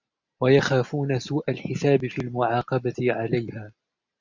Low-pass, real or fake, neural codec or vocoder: 7.2 kHz; real; none